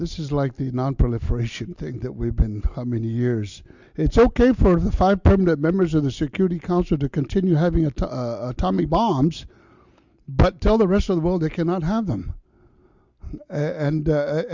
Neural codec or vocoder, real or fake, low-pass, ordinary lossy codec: none; real; 7.2 kHz; Opus, 64 kbps